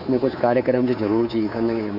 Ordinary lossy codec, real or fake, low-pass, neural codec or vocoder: none; fake; 5.4 kHz; codec, 24 kHz, 3.1 kbps, DualCodec